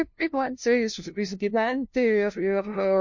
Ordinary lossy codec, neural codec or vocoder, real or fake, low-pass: MP3, 48 kbps; codec, 16 kHz, 0.5 kbps, FunCodec, trained on LibriTTS, 25 frames a second; fake; 7.2 kHz